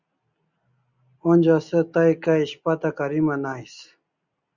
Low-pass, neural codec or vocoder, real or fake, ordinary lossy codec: 7.2 kHz; none; real; Opus, 64 kbps